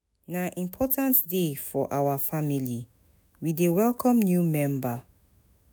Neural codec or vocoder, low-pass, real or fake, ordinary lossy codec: autoencoder, 48 kHz, 128 numbers a frame, DAC-VAE, trained on Japanese speech; none; fake; none